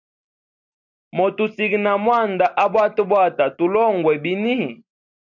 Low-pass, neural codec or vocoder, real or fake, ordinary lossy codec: 7.2 kHz; none; real; MP3, 64 kbps